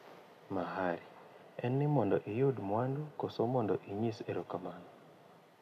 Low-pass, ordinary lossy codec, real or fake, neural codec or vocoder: 14.4 kHz; none; real; none